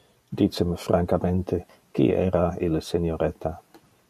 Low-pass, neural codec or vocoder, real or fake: 14.4 kHz; none; real